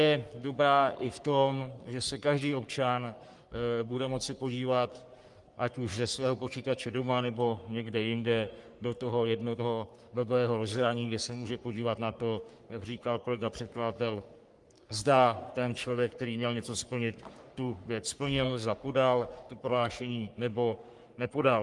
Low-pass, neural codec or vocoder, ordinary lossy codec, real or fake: 10.8 kHz; codec, 44.1 kHz, 3.4 kbps, Pupu-Codec; Opus, 32 kbps; fake